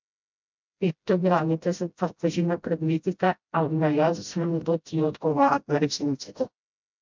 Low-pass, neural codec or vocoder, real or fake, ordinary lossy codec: 7.2 kHz; codec, 16 kHz, 0.5 kbps, FreqCodec, smaller model; fake; MP3, 64 kbps